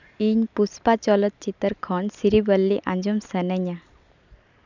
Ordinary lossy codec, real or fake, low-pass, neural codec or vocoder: none; real; 7.2 kHz; none